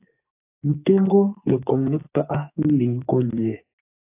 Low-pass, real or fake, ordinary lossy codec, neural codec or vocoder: 3.6 kHz; fake; AAC, 24 kbps; codec, 44.1 kHz, 2.6 kbps, SNAC